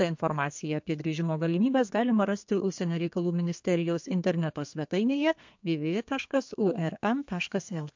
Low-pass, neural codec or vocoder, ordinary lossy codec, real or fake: 7.2 kHz; codec, 32 kHz, 1.9 kbps, SNAC; MP3, 48 kbps; fake